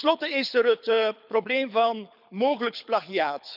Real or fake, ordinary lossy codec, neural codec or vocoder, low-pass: fake; none; codec, 24 kHz, 6 kbps, HILCodec; 5.4 kHz